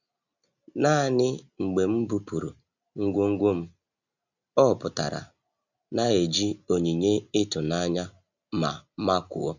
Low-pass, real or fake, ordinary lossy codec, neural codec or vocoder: 7.2 kHz; real; none; none